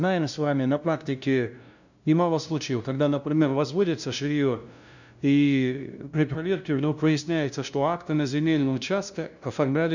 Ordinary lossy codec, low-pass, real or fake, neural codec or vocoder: none; 7.2 kHz; fake; codec, 16 kHz, 0.5 kbps, FunCodec, trained on LibriTTS, 25 frames a second